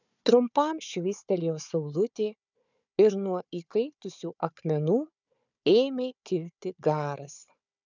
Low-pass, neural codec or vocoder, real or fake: 7.2 kHz; codec, 16 kHz, 4 kbps, FunCodec, trained on Chinese and English, 50 frames a second; fake